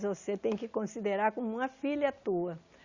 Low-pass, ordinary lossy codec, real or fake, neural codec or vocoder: 7.2 kHz; Opus, 64 kbps; real; none